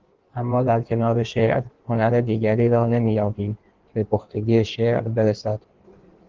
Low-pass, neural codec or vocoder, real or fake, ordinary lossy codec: 7.2 kHz; codec, 16 kHz in and 24 kHz out, 1.1 kbps, FireRedTTS-2 codec; fake; Opus, 16 kbps